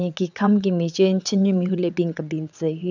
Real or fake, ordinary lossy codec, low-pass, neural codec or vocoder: fake; none; 7.2 kHz; vocoder, 22.05 kHz, 80 mel bands, WaveNeXt